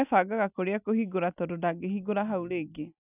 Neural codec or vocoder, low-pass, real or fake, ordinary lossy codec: none; 3.6 kHz; real; none